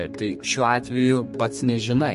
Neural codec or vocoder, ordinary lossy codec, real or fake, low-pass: codec, 32 kHz, 1.9 kbps, SNAC; MP3, 48 kbps; fake; 14.4 kHz